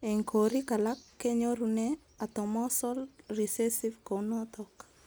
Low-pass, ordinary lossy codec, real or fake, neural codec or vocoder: none; none; real; none